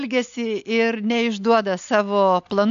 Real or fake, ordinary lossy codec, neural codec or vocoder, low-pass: real; AAC, 64 kbps; none; 7.2 kHz